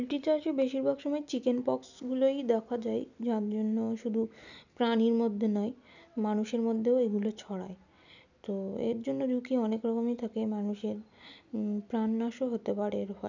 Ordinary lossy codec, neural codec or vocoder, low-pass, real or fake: none; none; 7.2 kHz; real